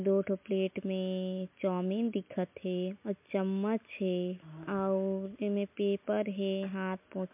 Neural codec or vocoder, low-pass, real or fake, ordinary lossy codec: none; 3.6 kHz; real; MP3, 32 kbps